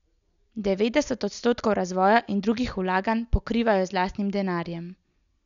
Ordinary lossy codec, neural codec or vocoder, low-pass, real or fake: none; none; 7.2 kHz; real